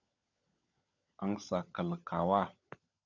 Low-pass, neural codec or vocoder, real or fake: 7.2 kHz; codec, 16 kHz, 16 kbps, FunCodec, trained on LibriTTS, 50 frames a second; fake